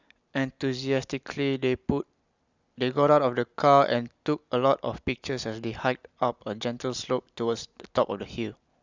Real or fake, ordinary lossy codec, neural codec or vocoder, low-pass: real; Opus, 64 kbps; none; 7.2 kHz